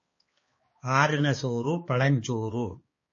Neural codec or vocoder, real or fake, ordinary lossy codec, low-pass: codec, 16 kHz, 2 kbps, X-Codec, HuBERT features, trained on balanced general audio; fake; MP3, 32 kbps; 7.2 kHz